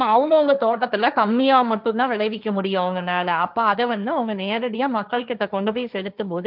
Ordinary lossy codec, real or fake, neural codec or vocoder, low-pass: none; fake; codec, 16 kHz, 1.1 kbps, Voila-Tokenizer; 5.4 kHz